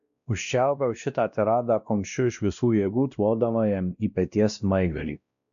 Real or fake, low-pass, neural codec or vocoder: fake; 7.2 kHz; codec, 16 kHz, 1 kbps, X-Codec, WavLM features, trained on Multilingual LibriSpeech